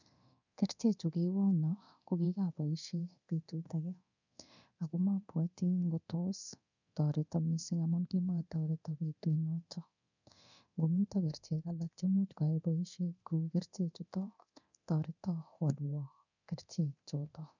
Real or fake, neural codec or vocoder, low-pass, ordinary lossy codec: fake; codec, 24 kHz, 0.9 kbps, DualCodec; 7.2 kHz; none